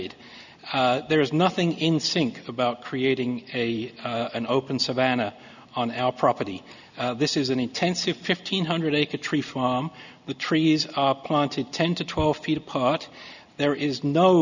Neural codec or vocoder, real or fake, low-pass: none; real; 7.2 kHz